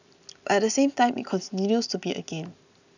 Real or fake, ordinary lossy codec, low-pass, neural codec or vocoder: real; none; 7.2 kHz; none